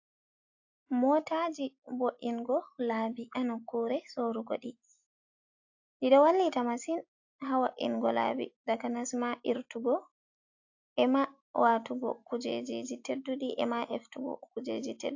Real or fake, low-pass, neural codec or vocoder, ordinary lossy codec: real; 7.2 kHz; none; AAC, 48 kbps